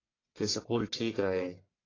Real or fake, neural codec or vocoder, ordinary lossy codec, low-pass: fake; codec, 44.1 kHz, 1.7 kbps, Pupu-Codec; AAC, 32 kbps; 7.2 kHz